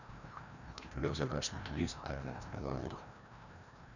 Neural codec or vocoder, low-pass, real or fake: codec, 16 kHz, 1 kbps, FreqCodec, larger model; 7.2 kHz; fake